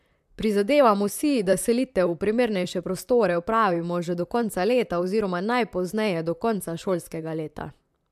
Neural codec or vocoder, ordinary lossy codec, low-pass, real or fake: vocoder, 44.1 kHz, 128 mel bands, Pupu-Vocoder; MP3, 96 kbps; 14.4 kHz; fake